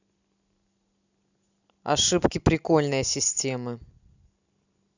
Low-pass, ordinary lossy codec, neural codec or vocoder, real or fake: 7.2 kHz; none; none; real